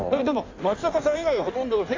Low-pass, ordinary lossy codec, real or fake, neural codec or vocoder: 7.2 kHz; AAC, 48 kbps; fake; codec, 16 kHz in and 24 kHz out, 1.1 kbps, FireRedTTS-2 codec